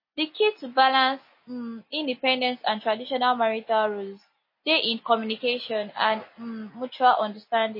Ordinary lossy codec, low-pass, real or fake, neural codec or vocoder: MP3, 24 kbps; 5.4 kHz; real; none